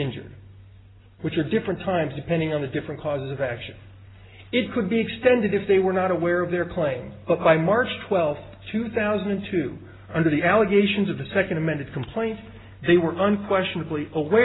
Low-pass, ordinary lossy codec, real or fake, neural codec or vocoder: 7.2 kHz; AAC, 16 kbps; real; none